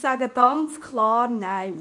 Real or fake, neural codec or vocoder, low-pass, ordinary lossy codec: fake; codec, 24 kHz, 0.9 kbps, WavTokenizer, medium speech release version 2; 10.8 kHz; none